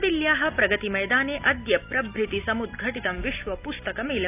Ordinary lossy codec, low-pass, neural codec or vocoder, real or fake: none; 3.6 kHz; none; real